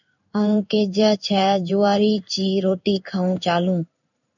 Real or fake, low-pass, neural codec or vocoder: fake; 7.2 kHz; codec, 16 kHz in and 24 kHz out, 1 kbps, XY-Tokenizer